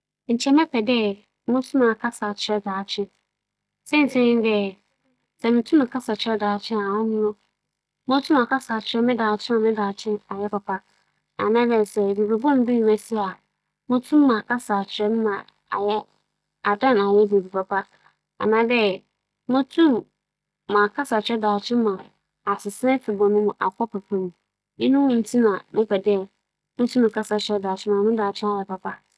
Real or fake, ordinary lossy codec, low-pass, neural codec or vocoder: real; none; none; none